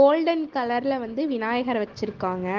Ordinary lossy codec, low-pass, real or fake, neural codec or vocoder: Opus, 16 kbps; 7.2 kHz; real; none